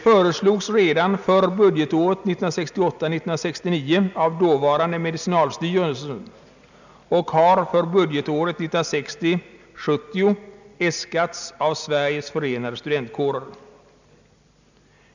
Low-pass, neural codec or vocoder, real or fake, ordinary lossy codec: 7.2 kHz; none; real; none